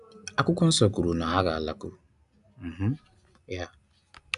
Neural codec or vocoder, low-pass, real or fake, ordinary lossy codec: none; 10.8 kHz; real; none